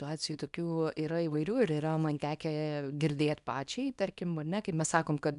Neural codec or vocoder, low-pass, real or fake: codec, 24 kHz, 0.9 kbps, WavTokenizer, small release; 10.8 kHz; fake